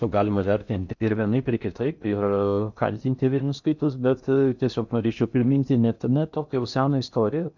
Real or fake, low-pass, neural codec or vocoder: fake; 7.2 kHz; codec, 16 kHz in and 24 kHz out, 0.6 kbps, FocalCodec, streaming, 4096 codes